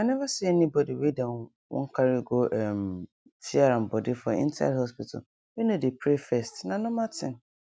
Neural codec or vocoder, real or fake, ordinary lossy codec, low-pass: none; real; none; none